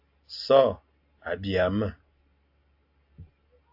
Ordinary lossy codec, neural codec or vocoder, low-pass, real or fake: AAC, 48 kbps; none; 5.4 kHz; real